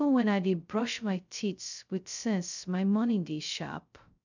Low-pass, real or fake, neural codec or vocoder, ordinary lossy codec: 7.2 kHz; fake; codec, 16 kHz, 0.2 kbps, FocalCodec; none